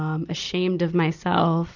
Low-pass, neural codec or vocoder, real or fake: 7.2 kHz; vocoder, 44.1 kHz, 128 mel bands every 256 samples, BigVGAN v2; fake